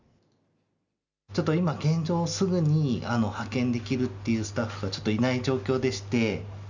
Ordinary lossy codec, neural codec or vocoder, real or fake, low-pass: none; none; real; 7.2 kHz